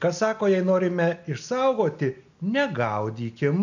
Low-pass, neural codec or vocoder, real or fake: 7.2 kHz; none; real